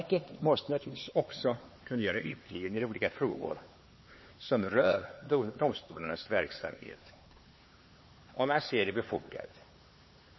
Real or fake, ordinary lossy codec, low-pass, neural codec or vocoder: fake; MP3, 24 kbps; 7.2 kHz; codec, 16 kHz, 4 kbps, X-Codec, HuBERT features, trained on LibriSpeech